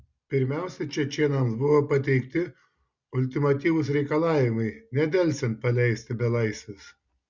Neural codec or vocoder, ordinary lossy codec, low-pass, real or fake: none; Opus, 64 kbps; 7.2 kHz; real